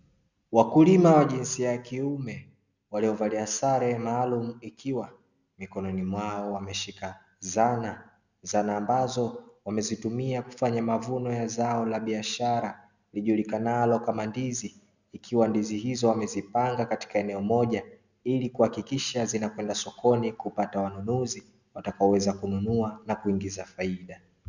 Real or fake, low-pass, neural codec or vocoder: real; 7.2 kHz; none